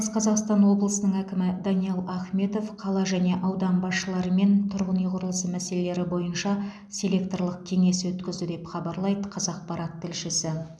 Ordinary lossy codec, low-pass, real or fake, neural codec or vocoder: none; none; real; none